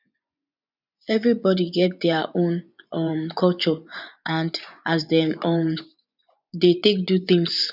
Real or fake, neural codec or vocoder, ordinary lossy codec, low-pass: fake; vocoder, 24 kHz, 100 mel bands, Vocos; none; 5.4 kHz